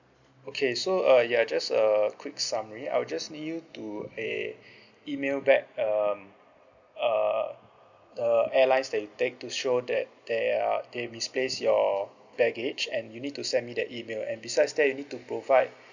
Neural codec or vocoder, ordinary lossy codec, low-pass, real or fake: none; none; 7.2 kHz; real